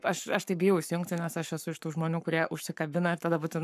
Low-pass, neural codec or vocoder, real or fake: 14.4 kHz; codec, 44.1 kHz, 7.8 kbps, Pupu-Codec; fake